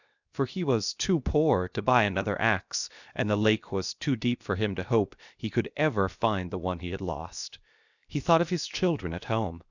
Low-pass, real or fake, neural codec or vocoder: 7.2 kHz; fake; codec, 16 kHz, 0.7 kbps, FocalCodec